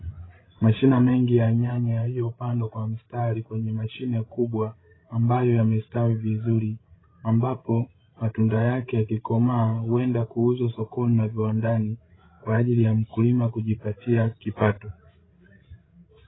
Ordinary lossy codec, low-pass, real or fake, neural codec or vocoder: AAC, 16 kbps; 7.2 kHz; fake; codec, 16 kHz, 16 kbps, FreqCodec, larger model